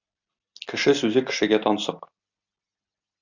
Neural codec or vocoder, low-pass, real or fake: none; 7.2 kHz; real